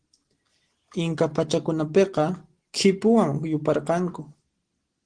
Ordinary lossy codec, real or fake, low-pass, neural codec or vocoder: Opus, 16 kbps; real; 9.9 kHz; none